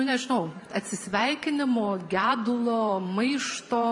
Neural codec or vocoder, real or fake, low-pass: vocoder, 44.1 kHz, 128 mel bands every 512 samples, BigVGAN v2; fake; 10.8 kHz